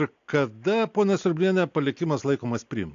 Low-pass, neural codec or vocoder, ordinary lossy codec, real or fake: 7.2 kHz; none; AAC, 64 kbps; real